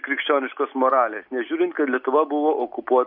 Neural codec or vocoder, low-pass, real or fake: none; 5.4 kHz; real